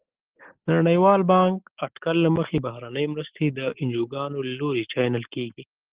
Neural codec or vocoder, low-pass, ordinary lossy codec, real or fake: none; 3.6 kHz; Opus, 16 kbps; real